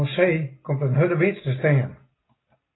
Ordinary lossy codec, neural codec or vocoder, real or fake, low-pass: AAC, 16 kbps; none; real; 7.2 kHz